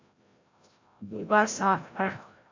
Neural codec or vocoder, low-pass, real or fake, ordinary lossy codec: codec, 16 kHz, 0.5 kbps, FreqCodec, larger model; 7.2 kHz; fake; MP3, 64 kbps